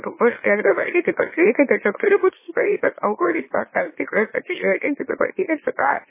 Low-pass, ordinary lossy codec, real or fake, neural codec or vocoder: 3.6 kHz; MP3, 16 kbps; fake; autoencoder, 44.1 kHz, a latent of 192 numbers a frame, MeloTTS